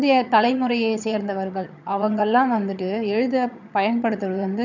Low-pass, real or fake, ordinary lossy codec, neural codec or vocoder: 7.2 kHz; fake; none; vocoder, 22.05 kHz, 80 mel bands, HiFi-GAN